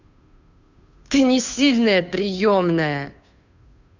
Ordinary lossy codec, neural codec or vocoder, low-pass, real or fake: none; codec, 16 kHz, 2 kbps, FunCodec, trained on Chinese and English, 25 frames a second; 7.2 kHz; fake